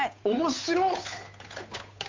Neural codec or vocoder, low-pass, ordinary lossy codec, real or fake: codec, 16 kHz, 8 kbps, FunCodec, trained on Chinese and English, 25 frames a second; 7.2 kHz; MP3, 48 kbps; fake